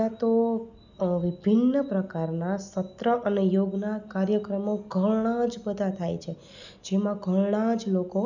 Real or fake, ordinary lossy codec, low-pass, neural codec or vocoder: real; none; 7.2 kHz; none